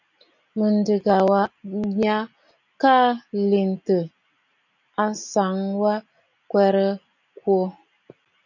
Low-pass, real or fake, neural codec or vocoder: 7.2 kHz; real; none